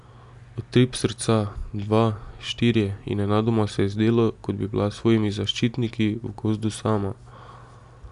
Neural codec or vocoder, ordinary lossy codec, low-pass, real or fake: none; none; 10.8 kHz; real